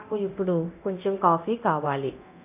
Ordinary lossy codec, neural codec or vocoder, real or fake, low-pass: none; codec, 24 kHz, 0.9 kbps, DualCodec; fake; 3.6 kHz